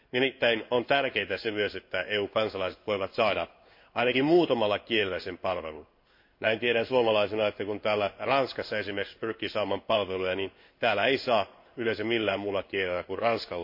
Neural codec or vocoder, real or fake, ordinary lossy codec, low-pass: codec, 16 kHz in and 24 kHz out, 1 kbps, XY-Tokenizer; fake; MP3, 32 kbps; 5.4 kHz